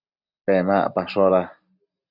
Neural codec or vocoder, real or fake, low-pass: none; real; 5.4 kHz